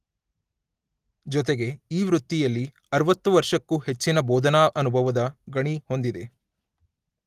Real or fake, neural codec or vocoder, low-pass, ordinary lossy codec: real; none; 14.4 kHz; Opus, 24 kbps